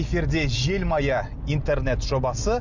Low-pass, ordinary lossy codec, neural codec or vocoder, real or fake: 7.2 kHz; none; none; real